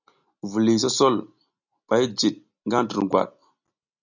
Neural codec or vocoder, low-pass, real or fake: none; 7.2 kHz; real